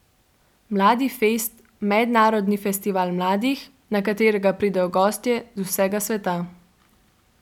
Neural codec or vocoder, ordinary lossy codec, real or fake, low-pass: none; none; real; 19.8 kHz